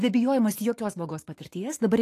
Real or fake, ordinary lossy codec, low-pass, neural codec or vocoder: fake; AAC, 48 kbps; 14.4 kHz; autoencoder, 48 kHz, 128 numbers a frame, DAC-VAE, trained on Japanese speech